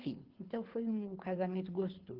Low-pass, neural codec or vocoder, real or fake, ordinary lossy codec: 5.4 kHz; codec, 24 kHz, 6 kbps, HILCodec; fake; Opus, 16 kbps